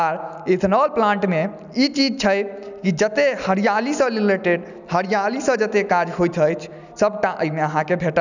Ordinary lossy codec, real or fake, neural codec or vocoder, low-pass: none; real; none; 7.2 kHz